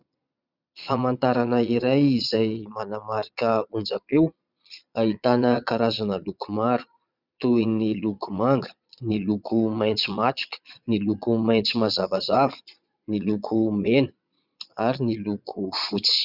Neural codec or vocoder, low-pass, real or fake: vocoder, 22.05 kHz, 80 mel bands, Vocos; 5.4 kHz; fake